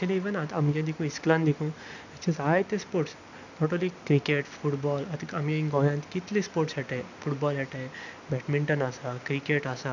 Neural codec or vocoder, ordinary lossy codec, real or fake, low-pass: none; none; real; 7.2 kHz